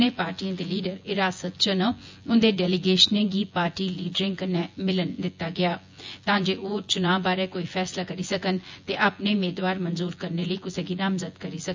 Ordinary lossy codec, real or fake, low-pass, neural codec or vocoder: MP3, 64 kbps; fake; 7.2 kHz; vocoder, 24 kHz, 100 mel bands, Vocos